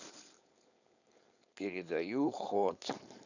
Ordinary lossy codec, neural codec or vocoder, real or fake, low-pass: none; codec, 16 kHz, 4.8 kbps, FACodec; fake; 7.2 kHz